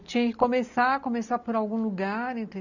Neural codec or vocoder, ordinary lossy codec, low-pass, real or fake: none; none; 7.2 kHz; real